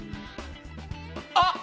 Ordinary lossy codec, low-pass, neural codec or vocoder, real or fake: none; none; none; real